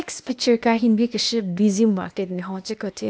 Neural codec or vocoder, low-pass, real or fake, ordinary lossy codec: codec, 16 kHz, 0.8 kbps, ZipCodec; none; fake; none